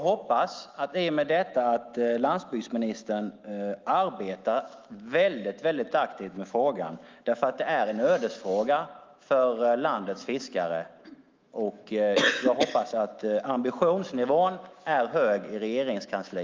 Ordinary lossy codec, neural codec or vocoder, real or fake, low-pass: Opus, 32 kbps; autoencoder, 48 kHz, 128 numbers a frame, DAC-VAE, trained on Japanese speech; fake; 7.2 kHz